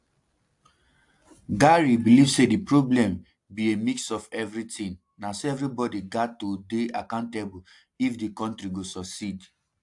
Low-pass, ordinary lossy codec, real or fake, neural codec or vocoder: 10.8 kHz; AAC, 64 kbps; real; none